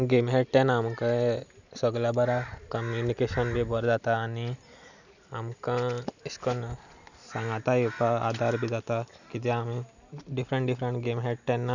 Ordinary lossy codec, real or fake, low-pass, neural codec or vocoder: none; real; 7.2 kHz; none